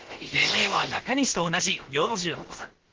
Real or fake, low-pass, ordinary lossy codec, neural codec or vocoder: fake; 7.2 kHz; Opus, 16 kbps; codec, 16 kHz, about 1 kbps, DyCAST, with the encoder's durations